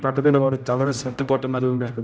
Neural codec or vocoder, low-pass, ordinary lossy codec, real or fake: codec, 16 kHz, 0.5 kbps, X-Codec, HuBERT features, trained on general audio; none; none; fake